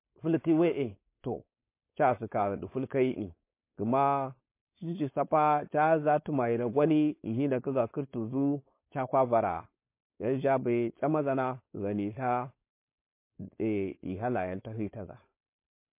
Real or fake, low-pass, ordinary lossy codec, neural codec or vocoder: fake; 3.6 kHz; MP3, 24 kbps; codec, 16 kHz, 4.8 kbps, FACodec